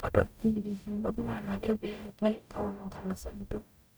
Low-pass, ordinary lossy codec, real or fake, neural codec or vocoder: none; none; fake; codec, 44.1 kHz, 0.9 kbps, DAC